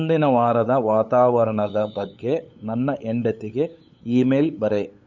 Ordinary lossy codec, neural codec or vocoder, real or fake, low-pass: none; codec, 16 kHz, 8 kbps, FunCodec, trained on LibriTTS, 25 frames a second; fake; 7.2 kHz